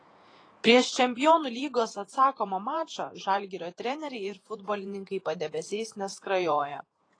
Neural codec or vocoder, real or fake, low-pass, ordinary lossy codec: vocoder, 48 kHz, 128 mel bands, Vocos; fake; 9.9 kHz; AAC, 32 kbps